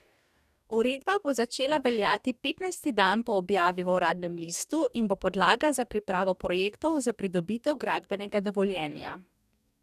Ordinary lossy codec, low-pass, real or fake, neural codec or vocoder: none; 14.4 kHz; fake; codec, 44.1 kHz, 2.6 kbps, DAC